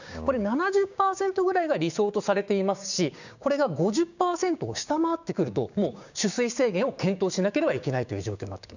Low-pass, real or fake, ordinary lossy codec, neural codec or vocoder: 7.2 kHz; fake; none; codec, 16 kHz, 6 kbps, DAC